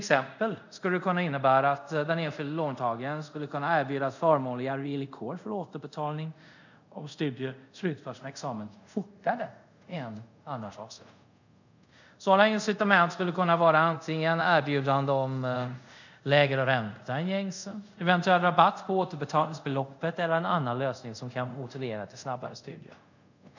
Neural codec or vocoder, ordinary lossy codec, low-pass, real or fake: codec, 24 kHz, 0.5 kbps, DualCodec; none; 7.2 kHz; fake